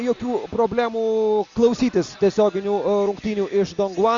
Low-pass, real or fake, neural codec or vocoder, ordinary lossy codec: 7.2 kHz; real; none; Opus, 64 kbps